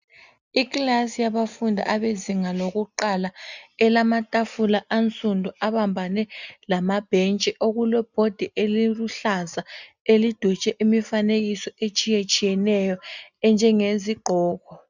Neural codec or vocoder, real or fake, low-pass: none; real; 7.2 kHz